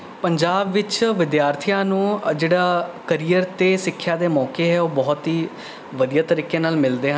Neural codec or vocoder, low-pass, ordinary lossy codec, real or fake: none; none; none; real